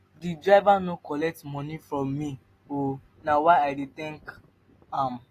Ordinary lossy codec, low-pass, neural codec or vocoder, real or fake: AAC, 48 kbps; 14.4 kHz; none; real